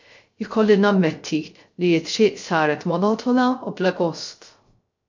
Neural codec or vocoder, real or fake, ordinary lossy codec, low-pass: codec, 16 kHz, 0.3 kbps, FocalCodec; fake; MP3, 48 kbps; 7.2 kHz